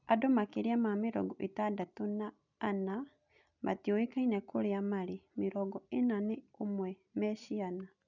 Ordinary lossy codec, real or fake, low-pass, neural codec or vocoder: none; real; 7.2 kHz; none